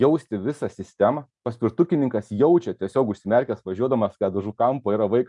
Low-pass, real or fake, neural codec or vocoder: 10.8 kHz; real; none